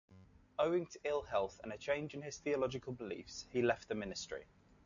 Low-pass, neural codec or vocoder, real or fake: 7.2 kHz; none; real